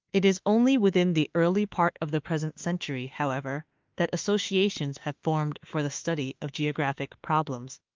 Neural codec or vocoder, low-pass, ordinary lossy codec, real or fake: autoencoder, 48 kHz, 32 numbers a frame, DAC-VAE, trained on Japanese speech; 7.2 kHz; Opus, 24 kbps; fake